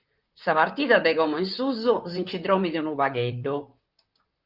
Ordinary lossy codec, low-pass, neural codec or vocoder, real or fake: Opus, 24 kbps; 5.4 kHz; vocoder, 44.1 kHz, 128 mel bands, Pupu-Vocoder; fake